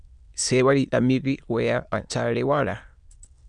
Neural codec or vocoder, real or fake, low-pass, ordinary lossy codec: autoencoder, 22.05 kHz, a latent of 192 numbers a frame, VITS, trained on many speakers; fake; 9.9 kHz; MP3, 96 kbps